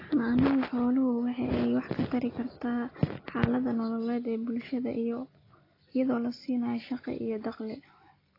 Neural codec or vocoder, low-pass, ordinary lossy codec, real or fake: none; 5.4 kHz; AAC, 24 kbps; real